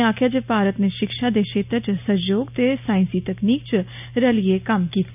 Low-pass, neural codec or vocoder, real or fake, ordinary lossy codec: 3.6 kHz; none; real; none